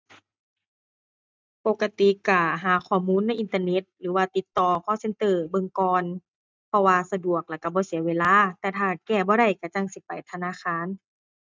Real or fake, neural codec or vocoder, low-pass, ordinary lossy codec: real; none; none; none